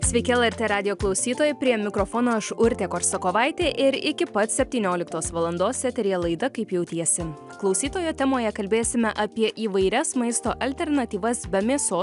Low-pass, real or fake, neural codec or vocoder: 10.8 kHz; real; none